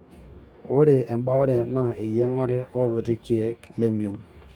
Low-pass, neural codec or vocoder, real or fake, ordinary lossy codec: 19.8 kHz; codec, 44.1 kHz, 2.6 kbps, DAC; fake; MP3, 96 kbps